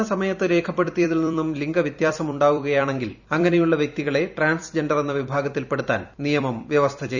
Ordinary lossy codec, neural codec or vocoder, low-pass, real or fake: none; vocoder, 44.1 kHz, 128 mel bands every 256 samples, BigVGAN v2; 7.2 kHz; fake